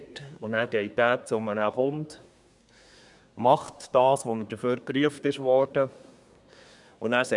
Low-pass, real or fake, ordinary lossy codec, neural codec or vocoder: 10.8 kHz; fake; none; codec, 24 kHz, 1 kbps, SNAC